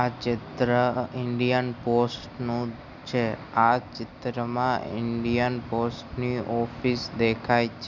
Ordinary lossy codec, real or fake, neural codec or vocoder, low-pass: none; real; none; 7.2 kHz